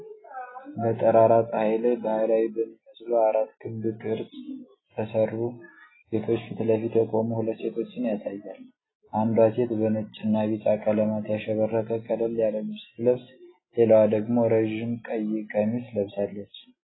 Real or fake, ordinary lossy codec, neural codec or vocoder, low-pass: real; AAC, 16 kbps; none; 7.2 kHz